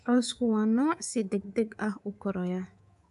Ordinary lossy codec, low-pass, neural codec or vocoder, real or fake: AAC, 96 kbps; 10.8 kHz; codec, 24 kHz, 3.1 kbps, DualCodec; fake